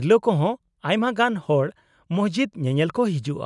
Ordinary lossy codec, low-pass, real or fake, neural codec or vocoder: none; 10.8 kHz; real; none